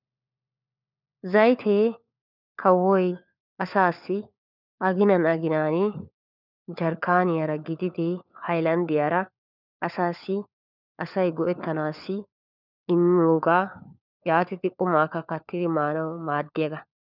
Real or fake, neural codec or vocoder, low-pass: fake; codec, 16 kHz, 4 kbps, FunCodec, trained on LibriTTS, 50 frames a second; 5.4 kHz